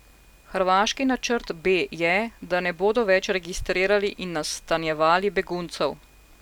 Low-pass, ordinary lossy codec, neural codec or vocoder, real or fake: 19.8 kHz; none; none; real